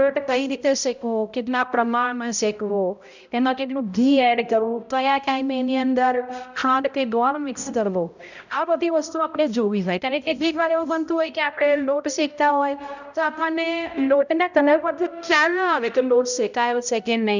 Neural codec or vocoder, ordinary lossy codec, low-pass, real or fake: codec, 16 kHz, 0.5 kbps, X-Codec, HuBERT features, trained on balanced general audio; none; 7.2 kHz; fake